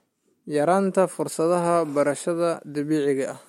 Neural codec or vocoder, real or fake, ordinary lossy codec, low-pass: none; real; MP3, 64 kbps; 19.8 kHz